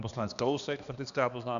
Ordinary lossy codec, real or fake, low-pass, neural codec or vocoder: MP3, 96 kbps; fake; 7.2 kHz; codec, 16 kHz, 2 kbps, X-Codec, HuBERT features, trained on balanced general audio